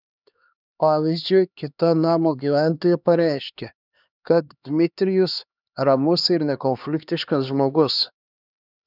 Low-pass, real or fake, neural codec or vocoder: 5.4 kHz; fake; codec, 16 kHz, 2 kbps, X-Codec, HuBERT features, trained on LibriSpeech